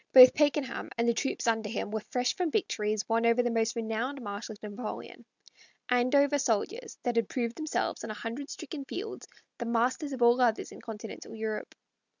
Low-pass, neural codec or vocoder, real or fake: 7.2 kHz; none; real